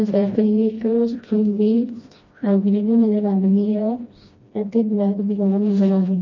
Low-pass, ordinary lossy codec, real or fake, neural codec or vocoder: 7.2 kHz; MP3, 32 kbps; fake; codec, 16 kHz, 1 kbps, FreqCodec, smaller model